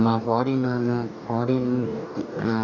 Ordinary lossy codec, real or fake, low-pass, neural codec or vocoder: none; fake; 7.2 kHz; codec, 44.1 kHz, 2.6 kbps, DAC